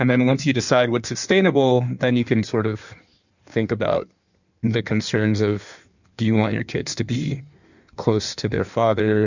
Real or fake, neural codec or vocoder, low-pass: fake; codec, 16 kHz in and 24 kHz out, 1.1 kbps, FireRedTTS-2 codec; 7.2 kHz